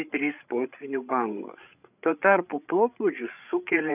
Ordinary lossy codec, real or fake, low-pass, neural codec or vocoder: AAC, 32 kbps; fake; 3.6 kHz; codec, 16 kHz, 8 kbps, FreqCodec, larger model